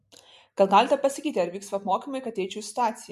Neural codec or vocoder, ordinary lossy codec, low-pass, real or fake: none; MP3, 64 kbps; 14.4 kHz; real